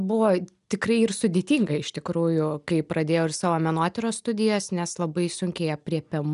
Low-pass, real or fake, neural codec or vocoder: 10.8 kHz; real; none